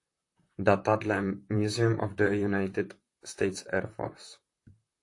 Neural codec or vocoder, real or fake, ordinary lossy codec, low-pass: vocoder, 44.1 kHz, 128 mel bands, Pupu-Vocoder; fake; AAC, 48 kbps; 10.8 kHz